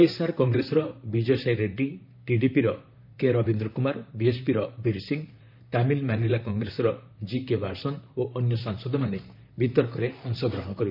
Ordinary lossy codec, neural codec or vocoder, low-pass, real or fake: none; vocoder, 44.1 kHz, 128 mel bands, Pupu-Vocoder; 5.4 kHz; fake